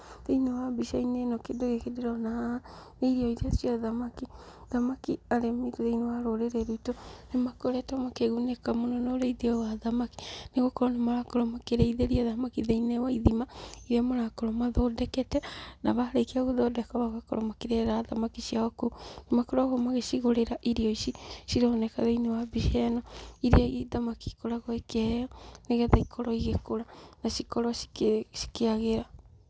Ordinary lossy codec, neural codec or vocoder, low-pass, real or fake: none; none; none; real